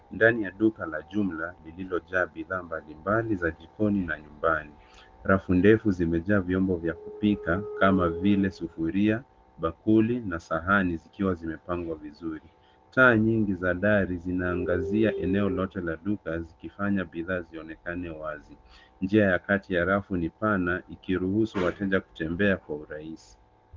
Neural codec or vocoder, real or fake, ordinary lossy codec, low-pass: none; real; Opus, 16 kbps; 7.2 kHz